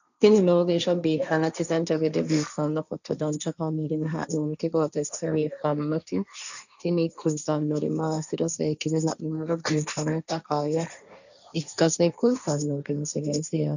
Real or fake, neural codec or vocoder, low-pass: fake; codec, 16 kHz, 1.1 kbps, Voila-Tokenizer; 7.2 kHz